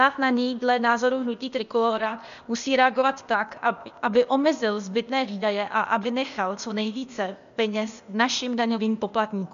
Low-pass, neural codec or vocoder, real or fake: 7.2 kHz; codec, 16 kHz, 0.8 kbps, ZipCodec; fake